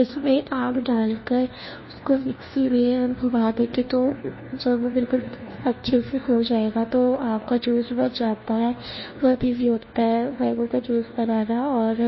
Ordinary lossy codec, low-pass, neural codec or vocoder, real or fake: MP3, 24 kbps; 7.2 kHz; codec, 16 kHz, 1 kbps, FunCodec, trained on LibriTTS, 50 frames a second; fake